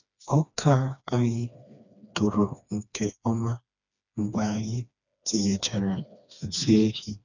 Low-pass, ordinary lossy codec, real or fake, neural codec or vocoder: 7.2 kHz; none; fake; codec, 16 kHz, 2 kbps, FreqCodec, smaller model